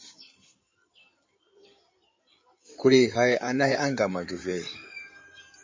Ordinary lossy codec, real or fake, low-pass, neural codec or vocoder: MP3, 32 kbps; fake; 7.2 kHz; codec, 16 kHz in and 24 kHz out, 2.2 kbps, FireRedTTS-2 codec